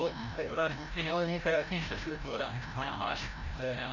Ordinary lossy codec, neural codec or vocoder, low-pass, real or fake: none; codec, 16 kHz, 0.5 kbps, FreqCodec, larger model; 7.2 kHz; fake